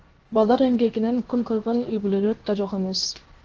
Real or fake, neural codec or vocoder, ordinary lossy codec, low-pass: fake; codec, 16 kHz, 0.7 kbps, FocalCodec; Opus, 16 kbps; 7.2 kHz